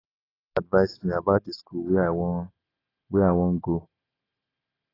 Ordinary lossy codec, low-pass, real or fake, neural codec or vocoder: AAC, 24 kbps; 5.4 kHz; real; none